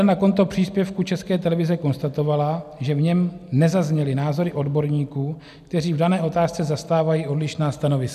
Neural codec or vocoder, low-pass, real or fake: none; 14.4 kHz; real